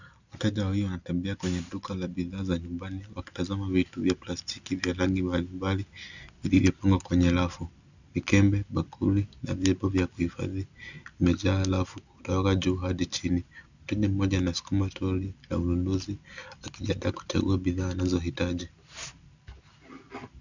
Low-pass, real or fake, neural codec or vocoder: 7.2 kHz; real; none